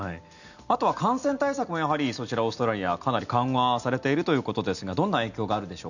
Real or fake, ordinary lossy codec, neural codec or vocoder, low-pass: real; none; none; 7.2 kHz